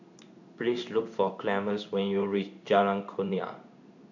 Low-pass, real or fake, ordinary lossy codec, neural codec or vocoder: 7.2 kHz; fake; none; codec, 16 kHz in and 24 kHz out, 1 kbps, XY-Tokenizer